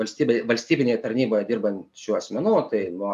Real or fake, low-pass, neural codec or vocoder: real; 14.4 kHz; none